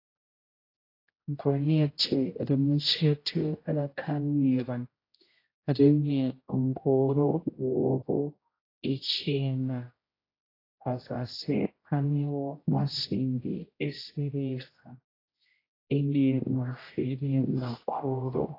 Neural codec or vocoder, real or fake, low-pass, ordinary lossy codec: codec, 16 kHz, 0.5 kbps, X-Codec, HuBERT features, trained on general audio; fake; 5.4 kHz; AAC, 24 kbps